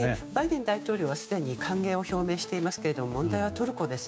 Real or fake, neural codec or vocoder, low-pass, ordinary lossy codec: fake; codec, 16 kHz, 6 kbps, DAC; none; none